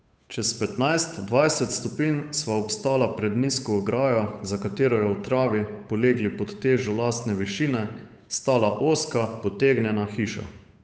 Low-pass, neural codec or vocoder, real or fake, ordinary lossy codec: none; codec, 16 kHz, 8 kbps, FunCodec, trained on Chinese and English, 25 frames a second; fake; none